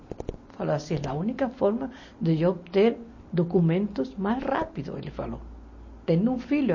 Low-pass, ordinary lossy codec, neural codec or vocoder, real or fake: 7.2 kHz; MP3, 32 kbps; none; real